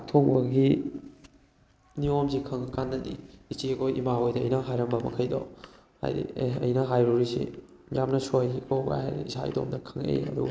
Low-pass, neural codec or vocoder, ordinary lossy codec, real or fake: none; none; none; real